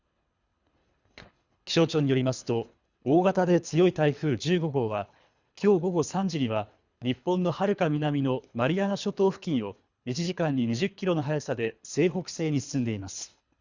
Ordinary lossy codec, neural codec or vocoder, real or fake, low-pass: Opus, 64 kbps; codec, 24 kHz, 3 kbps, HILCodec; fake; 7.2 kHz